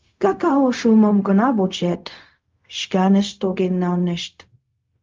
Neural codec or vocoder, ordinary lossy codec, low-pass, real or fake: codec, 16 kHz, 0.4 kbps, LongCat-Audio-Codec; Opus, 24 kbps; 7.2 kHz; fake